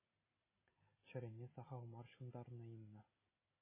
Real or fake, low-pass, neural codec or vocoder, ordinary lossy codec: real; 3.6 kHz; none; MP3, 16 kbps